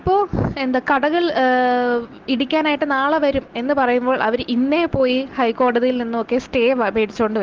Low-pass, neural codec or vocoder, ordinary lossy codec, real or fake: 7.2 kHz; none; Opus, 16 kbps; real